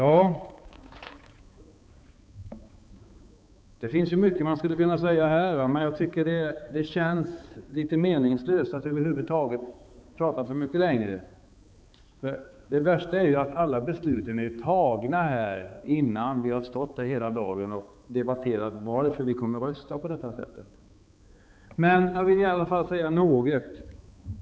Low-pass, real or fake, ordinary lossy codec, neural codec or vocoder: none; fake; none; codec, 16 kHz, 4 kbps, X-Codec, HuBERT features, trained on balanced general audio